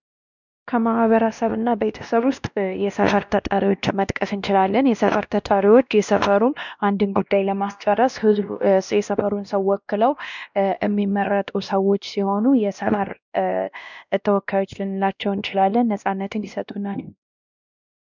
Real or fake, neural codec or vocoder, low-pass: fake; codec, 16 kHz, 1 kbps, X-Codec, WavLM features, trained on Multilingual LibriSpeech; 7.2 kHz